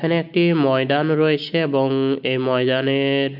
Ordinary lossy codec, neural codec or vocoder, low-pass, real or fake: none; none; 5.4 kHz; real